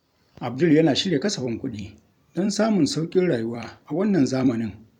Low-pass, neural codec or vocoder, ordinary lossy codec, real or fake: 19.8 kHz; vocoder, 44.1 kHz, 128 mel bands every 256 samples, BigVGAN v2; none; fake